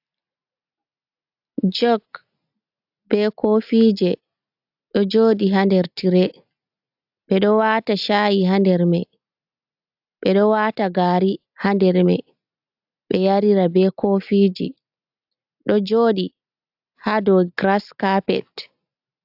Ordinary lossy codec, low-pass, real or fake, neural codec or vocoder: AAC, 48 kbps; 5.4 kHz; real; none